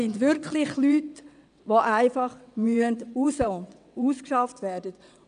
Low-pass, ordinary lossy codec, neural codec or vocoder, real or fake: 9.9 kHz; none; vocoder, 22.05 kHz, 80 mel bands, WaveNeXt; fake